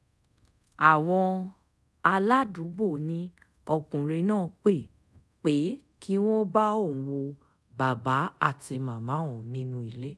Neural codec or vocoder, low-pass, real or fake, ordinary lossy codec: codec, 24 kHz, 0.5 kbps, DualCodec; none; fake; none